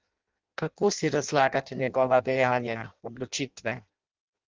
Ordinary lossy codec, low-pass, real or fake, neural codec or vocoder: Opus, 24 kbps; 7.2 kHz; fake; codec, 16 kHz in and 24 kHz out, 0.6 kbps, FireRedTTS-2 codec